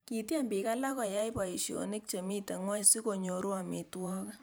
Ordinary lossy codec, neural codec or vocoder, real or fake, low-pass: none; vocoder, 44.1 kHz, 128 mel bands every 512 samples, BigVGAN v2; fake; none